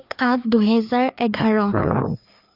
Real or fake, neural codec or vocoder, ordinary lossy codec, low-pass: fake; codec, 16 kHz, 2 kbps, FreqCodec, larger model; none; 5.4 kHz